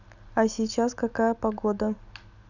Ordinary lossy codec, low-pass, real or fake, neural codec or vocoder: none; 7.2 kHz; real; none